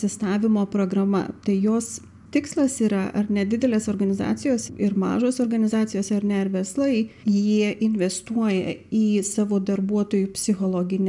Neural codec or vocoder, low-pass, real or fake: none; 10.8 kHz; real